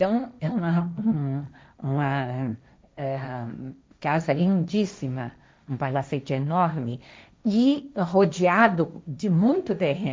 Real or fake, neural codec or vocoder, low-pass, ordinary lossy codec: fake; codec, 16 kHz, 1.1 kbps, Voila-Tokenizer; none; none